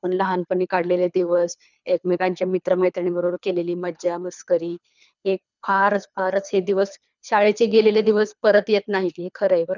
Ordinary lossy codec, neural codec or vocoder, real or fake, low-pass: none; codec, 24 kHz, 3 kbps, HILCodec; fake; 7.2 kHz